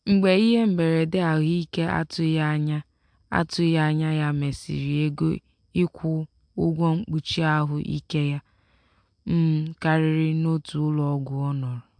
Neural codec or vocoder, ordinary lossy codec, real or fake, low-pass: none; MP3, 64 kbps; real; 9.9 kHz